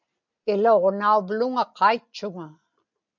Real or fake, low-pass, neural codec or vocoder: real; 7.2 kHz; none